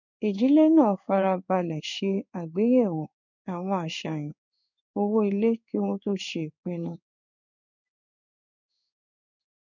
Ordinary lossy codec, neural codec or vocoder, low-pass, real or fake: none; codec, 16 kHz in and 24 kHz out, 1 kbps, XY-Tokenizer; 7.2 kHz; fake